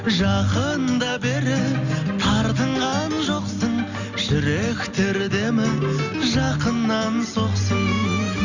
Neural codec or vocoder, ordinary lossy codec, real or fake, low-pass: none; none; real; 7.2 kHz